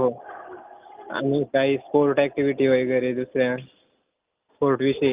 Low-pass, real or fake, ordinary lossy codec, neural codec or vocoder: 3.6 kHz; real; Opus, 24 kbps; none